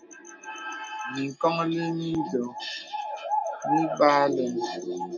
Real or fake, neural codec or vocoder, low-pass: real; none; 7.2 kHz